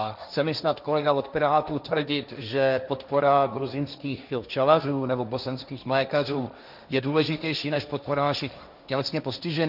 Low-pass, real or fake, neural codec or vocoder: 5.4 kHz; fake; codec, 16 kHz, 1.1 kbps, Voila-Tokenizer